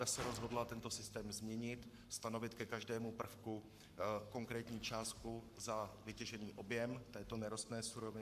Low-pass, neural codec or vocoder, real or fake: 14.4 kHz; codec, 44.1 kHz, 7.8 kbps, Pupu-Codec; fake